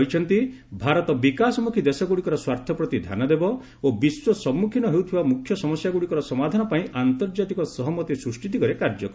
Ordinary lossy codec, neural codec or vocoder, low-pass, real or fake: none; none; none; real